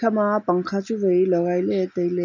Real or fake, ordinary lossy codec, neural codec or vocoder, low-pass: real; none; none; 7.2 kHz